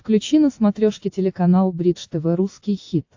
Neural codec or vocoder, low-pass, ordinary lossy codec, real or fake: none; 7.2 kHz; AAC, 48 kbps; real